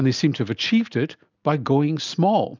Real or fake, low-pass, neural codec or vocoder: real; 7.2 kHz; none